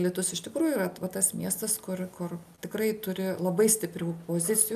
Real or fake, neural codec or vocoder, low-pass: real; none; 14.4 kHz